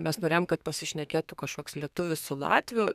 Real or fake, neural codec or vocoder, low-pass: fake; codec, 32 kHz, 1.9 kbps, SNAC; 14.4 kHz